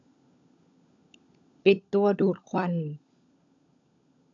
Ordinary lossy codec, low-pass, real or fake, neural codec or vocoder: AAC, 64 kbps; 7.2 kHz; fake; codec, 16 kHz, 16 kbps, FunCodec, trained on LibriTTS, 50 frames a second